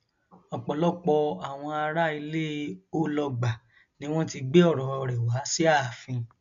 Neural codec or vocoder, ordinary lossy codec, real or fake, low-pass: none; MP3, 64 kbps; real; 7.2 kHz